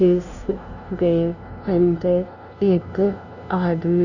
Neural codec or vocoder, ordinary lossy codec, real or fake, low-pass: codec, 16 kHz, 0.5 kbps, FunCodec, trained on LibriTTS, 25 frames a second; none; fake; 7.2 kHz